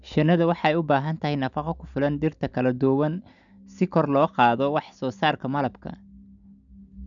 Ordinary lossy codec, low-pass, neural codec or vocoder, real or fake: none; 7.2 kHz; none; real